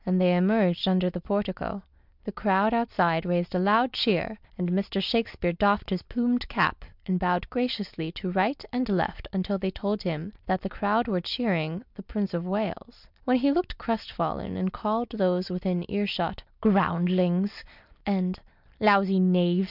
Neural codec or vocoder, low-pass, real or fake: none; 5.4 kHz; real